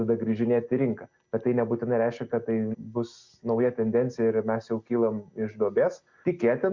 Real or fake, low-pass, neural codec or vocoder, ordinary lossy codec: real; 7.2 kHz; none; Opus, 64 kbps